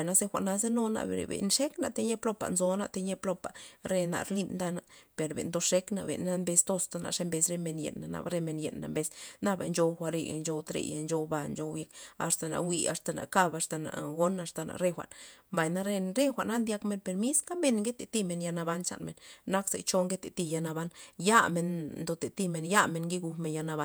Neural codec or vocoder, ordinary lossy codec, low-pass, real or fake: vocoder, 48 kHz, 128 mel bands, Vocos; none; none; fake